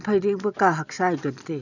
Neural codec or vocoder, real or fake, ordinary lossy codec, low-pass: none; real; none; 7.2 kHz